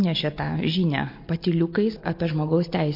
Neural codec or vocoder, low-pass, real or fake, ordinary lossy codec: none; 5.4 kHz; real; MP3, 32 kbps